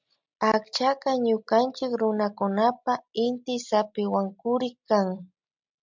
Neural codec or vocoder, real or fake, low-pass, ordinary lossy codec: none; real; 7.2 kHz; MP3, 64 kbps